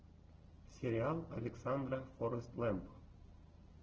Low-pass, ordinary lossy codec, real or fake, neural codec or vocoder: 7.2 kHz; Opus, 16 kbps; real; none